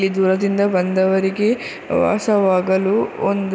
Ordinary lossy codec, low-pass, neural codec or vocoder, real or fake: none; none; none; real